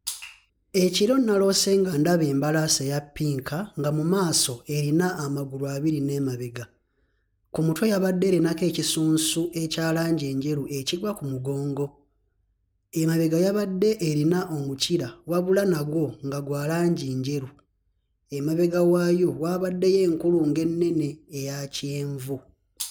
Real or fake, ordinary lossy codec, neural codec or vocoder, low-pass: real; none; none; none